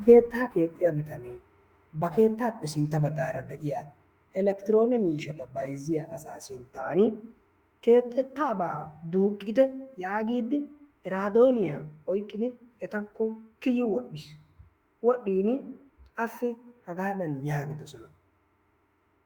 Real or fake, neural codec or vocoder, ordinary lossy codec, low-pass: fake; autoencoder, 48 kHz, 32 numbers a frame, DAC-VAE, trained on Japanese speech; Opus, 64 kbps; 19.8 kHz